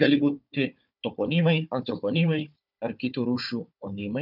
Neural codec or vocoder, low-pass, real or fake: codec, 16 kHz, 4 kbps, FunCodec, trained on Chinese and English, 50 frames a second; 5.4 kHz; fake